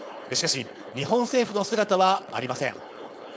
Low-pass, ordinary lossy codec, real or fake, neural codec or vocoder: none; none; fake; codec, 16 kHz, 4.8 kbps, FACodec